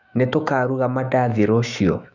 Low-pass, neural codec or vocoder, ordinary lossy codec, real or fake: 7.2 kHz; autoencoder, 48 kHz, 128 numbers a frame, DAC-VAE, trained on Japanese speech; none; fake